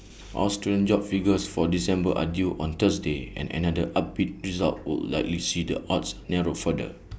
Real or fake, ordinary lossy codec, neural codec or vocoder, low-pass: real; none; none; none